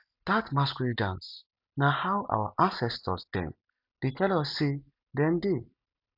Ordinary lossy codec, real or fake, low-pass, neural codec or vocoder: none; real; 5.4 kHz; none